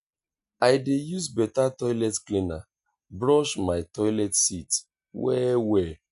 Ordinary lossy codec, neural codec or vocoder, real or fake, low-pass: none; none; real; 10.8 kHz